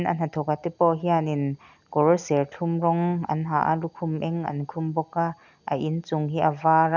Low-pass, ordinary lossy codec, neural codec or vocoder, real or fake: 7.2 kHz; none; none; real